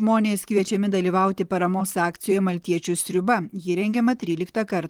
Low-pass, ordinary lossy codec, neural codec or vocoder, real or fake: 19.8 kHz; Opus, 24 kbps; vocoder, 44.1 kHz, 128 mel bands, Pupu-Vocoder; fake